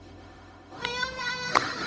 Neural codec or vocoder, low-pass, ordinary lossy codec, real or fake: codec, 16 kHz, 0.4 kbps, LongCat-Audio-Codec; none; none; fake